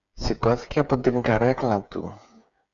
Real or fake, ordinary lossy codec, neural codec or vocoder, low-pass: fake; MP3, 64 kbps; codec, 16 kHz, 8 kbps, FreqCodec, smaller model; 7.2 kHz